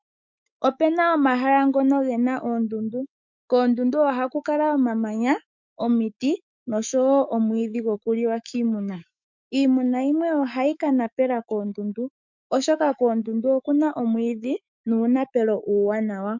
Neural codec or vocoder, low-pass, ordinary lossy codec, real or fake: autoencoder, 48 kHz, 128 numbers a frame, DAC-VAE, trained on Japanese speech; 7.2 kHz; MP3, 64 kbps; fake